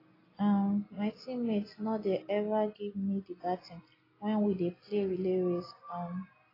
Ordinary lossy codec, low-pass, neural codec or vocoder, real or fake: AAC, 24 kbps; 5.4 kHz; none; real